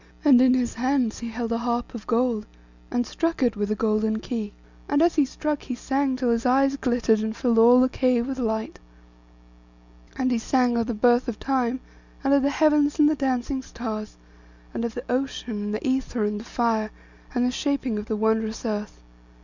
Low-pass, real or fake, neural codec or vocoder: 7.2 kHz; real; none